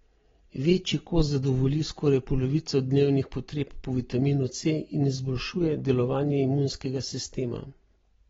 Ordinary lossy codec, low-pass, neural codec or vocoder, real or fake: AAC, 24 kbps; 7.2 kHz; none; real